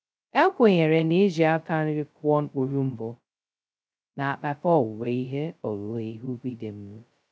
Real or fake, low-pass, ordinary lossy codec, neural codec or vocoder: fake; none; none; codec, 16 kHz, 0.2 kbps, FocalCodec